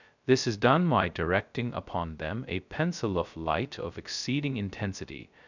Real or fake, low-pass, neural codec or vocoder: fake; 7.2 kHz; codec, 16 kHz, 0.2 kbps, FocalCodec